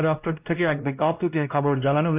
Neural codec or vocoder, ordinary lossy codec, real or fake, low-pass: codec, 16 kHz, 1 kbps, X-Codec, WavLM features, trained on Multilingual LibriSpeech; AAC, 32 kbps; fake; 3.6 kHz